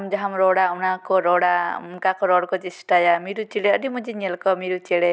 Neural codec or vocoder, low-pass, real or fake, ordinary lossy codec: none; none; real; none